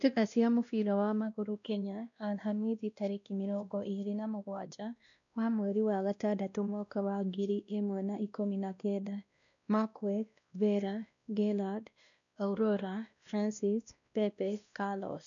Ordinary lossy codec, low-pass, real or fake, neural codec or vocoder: none; 7.2 kHz; fake; codec, 16 kHz, 1 kbps, X-Codec, WavLM features, trained on Multilingual LibriSpeech